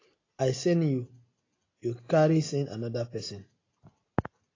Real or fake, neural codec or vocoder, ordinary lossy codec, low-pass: real; none; AAC, 32 kbps; 7.2 kHz